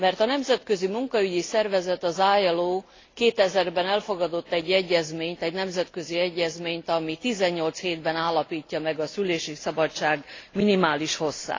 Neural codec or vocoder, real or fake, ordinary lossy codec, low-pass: none; real; AAC, 32 kbps; 7.2 kHz